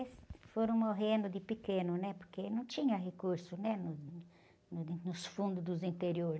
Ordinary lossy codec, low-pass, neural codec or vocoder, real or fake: none; none; none; real